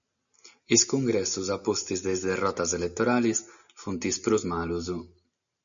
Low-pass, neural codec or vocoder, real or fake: 7.2 kHz; none; real